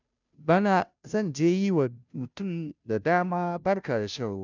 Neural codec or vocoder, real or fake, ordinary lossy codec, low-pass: codec, 16 kHz, 0.5 kbps, FunCodec, trained on Chinese and English, 25 frames a second; fake; none; 7.2 kHz